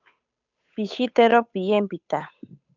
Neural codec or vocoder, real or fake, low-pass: codec, 16 kHz, 8 kbps, FunCodec, trained on Chinese and English, 25 frames a second; fake; 7.2 kHz